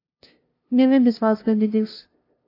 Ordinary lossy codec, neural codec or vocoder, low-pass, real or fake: AAC, 32 kbps; codec, 16 kHz, 0.5 kbps, FunCodec, trained on LibriTTS, 25 frames a second; 5.4 kHz; fake